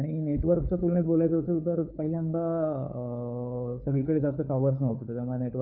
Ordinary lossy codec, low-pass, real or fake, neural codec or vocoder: none; 5.4 kHz; fake; codec, 16 kHz, 4 kbps, FunCodec, trained on LibriTTS, 50 frames a second